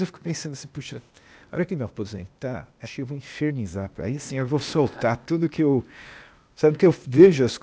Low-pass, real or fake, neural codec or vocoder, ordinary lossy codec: none; fake; codec, 16 kHz, 0.8 kbps, ZipCodec; none